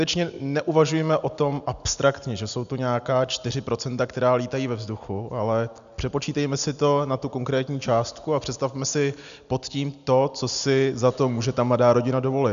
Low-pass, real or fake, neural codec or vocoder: 7.2 kHz; real; none